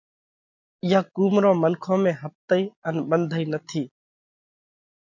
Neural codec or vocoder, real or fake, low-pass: none; real; 7.2 kHz